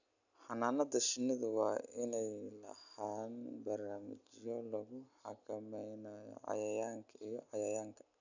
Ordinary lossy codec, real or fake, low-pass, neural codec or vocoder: none; real; 7.2 kHz; none